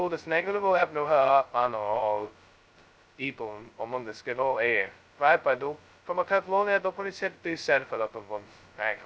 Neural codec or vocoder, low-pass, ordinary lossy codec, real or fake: codec, 16 kHz, 0.2 kbps, FocalCodec; none; none; fake